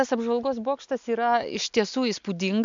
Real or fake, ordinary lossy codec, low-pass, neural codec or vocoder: real; MP3, 64 kbps; 7.2 kHz; none